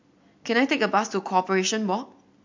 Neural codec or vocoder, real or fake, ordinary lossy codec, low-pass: none; real; MP3, 48 kbps; 7.2 kHz